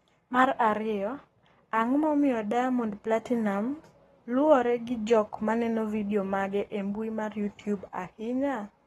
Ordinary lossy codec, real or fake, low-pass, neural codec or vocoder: AAC, 32 kbps; fake; 19.8 kHz; codec, 44.1 kHz, 7.8 kbps, Pupu-Codec